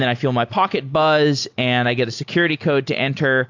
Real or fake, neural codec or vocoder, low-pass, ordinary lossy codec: real; none; 7.2 kHz; AAC, 48 kbps